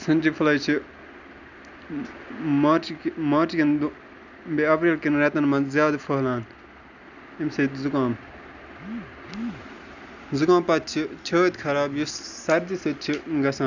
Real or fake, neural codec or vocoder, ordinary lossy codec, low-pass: real; none; none; 7.2 kHz